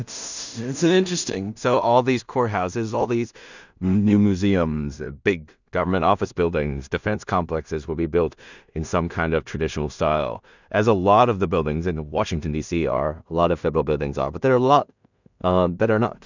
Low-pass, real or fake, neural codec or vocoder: 7.2 kHz; fake; codec, 16 kHz in and 24 kHz out, 0.4 kbps, LongCat-Audio-Codec, two codebook decoder